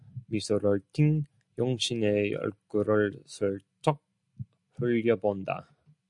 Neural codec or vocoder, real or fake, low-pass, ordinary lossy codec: none; real; 10.8 kHz; MP3, 96 kbps